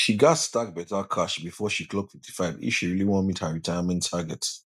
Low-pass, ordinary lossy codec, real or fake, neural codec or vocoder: 14.4 kHz; none; real; none